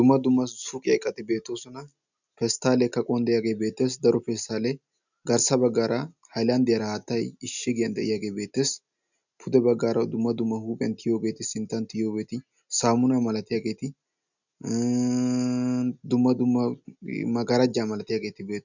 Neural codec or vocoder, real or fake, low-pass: none; real; 7.2 kHz